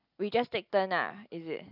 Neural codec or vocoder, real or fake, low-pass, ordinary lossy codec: none; real; 5.4 kHz; none